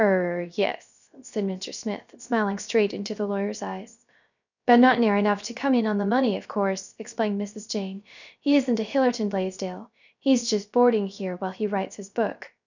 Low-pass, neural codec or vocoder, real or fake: 7.2 kHz; codec, 16 kHz, 0.3 kbps, FocalCodec; fake